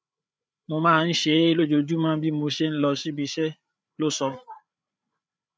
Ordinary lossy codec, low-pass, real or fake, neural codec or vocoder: none; none; fake; codec, 16 kHz, 8 kbps, FreqCodec, larger model